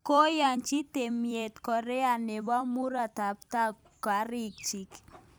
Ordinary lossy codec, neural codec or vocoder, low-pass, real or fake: none; vocoder, 44.1 kHz, 128 mel bands every 256 samples, BigVGAN v2; none; fake